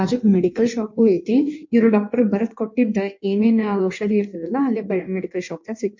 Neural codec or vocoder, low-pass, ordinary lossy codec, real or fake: codec, 16 kHz in and 24 kHz out, 1.1 kbps, FireRedTTS-2 codec; 7.2 kHz; MP3, 48 kbps; fake